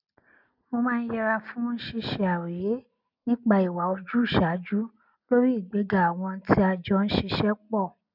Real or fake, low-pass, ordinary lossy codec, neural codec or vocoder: real; 5.4 kHz; none; none